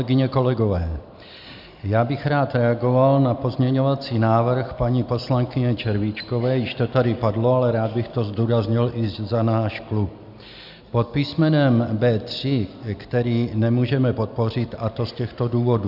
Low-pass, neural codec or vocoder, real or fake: 5.4 kHz; none; real